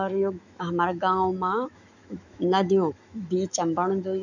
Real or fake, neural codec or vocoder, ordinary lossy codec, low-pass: fake; autoencoder, 48 kHz, 128 numbers a frame, DAC-VAE, trained on Japanese speech; none; 7.2 kHz